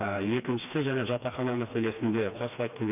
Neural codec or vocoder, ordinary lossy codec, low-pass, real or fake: codec, 16 kHz, 2 kbps, FreqCodec, smaller model; none; 3.6 kHz; fake